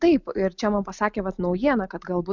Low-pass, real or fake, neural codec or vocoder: 7.2 kHz; real; none